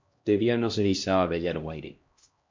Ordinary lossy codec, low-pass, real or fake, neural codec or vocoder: MP3, 48 kbps; 7.2 kHz; fake; codec, 16 kHz, 1 kbps, X-Codec, HuBERT features, trained on LibriSpeech